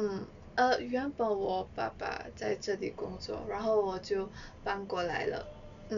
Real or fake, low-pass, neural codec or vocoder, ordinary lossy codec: real; 7.2 kHz; none; MP3, 96 kbps